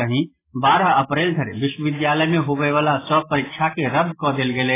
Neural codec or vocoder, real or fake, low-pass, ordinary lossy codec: none; real; 3.6 kHz; AAC, 16 kbps